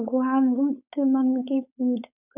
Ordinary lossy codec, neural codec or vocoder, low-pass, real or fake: none; codec, 16 kHz, 4.8 kbps, FACodec; 3.6 kHz; fake